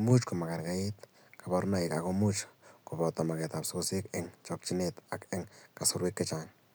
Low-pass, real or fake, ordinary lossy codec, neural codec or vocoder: none; real; none; none